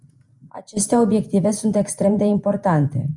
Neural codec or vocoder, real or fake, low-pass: vocoder, 48 kHz, 128 mel bands, Vocos; fake; 10.8 kHz